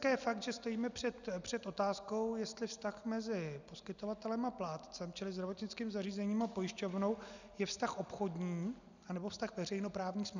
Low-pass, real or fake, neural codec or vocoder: 7.2 kHz; real; none